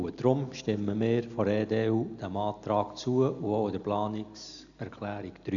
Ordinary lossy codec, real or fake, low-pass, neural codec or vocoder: none; real; 7.2 kHz; none